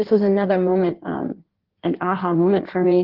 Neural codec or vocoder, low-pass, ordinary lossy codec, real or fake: codec, 16 kHz in and 24 kHz out, 1.1 kbps, FireRedTTS-2 codec; 5.4 kHz; Opus, 16 kbps; fake